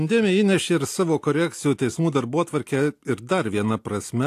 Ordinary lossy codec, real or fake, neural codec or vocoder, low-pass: AAC, 64 kbps; real; none; 14.4 kHz